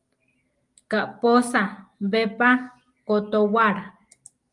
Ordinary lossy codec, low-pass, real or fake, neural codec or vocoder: Opus, 32 kbps; 10.8 kHz; real; none